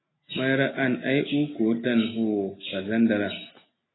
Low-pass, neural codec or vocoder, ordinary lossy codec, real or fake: 7.2 kHz; none; AAC, 16 kbps; real